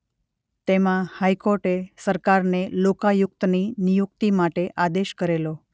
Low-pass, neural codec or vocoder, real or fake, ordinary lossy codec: none; none; real; none